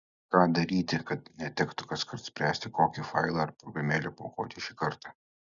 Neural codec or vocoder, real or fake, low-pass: none; real; 7.2 kHz